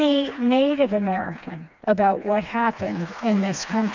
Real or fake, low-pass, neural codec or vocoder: fake; 7.2 kHz; codec, 16 kHz, 2 kbps, FreqCodec, smaller model